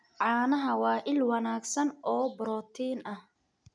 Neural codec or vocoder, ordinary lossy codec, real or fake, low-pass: none; none; real; 9.9 kHz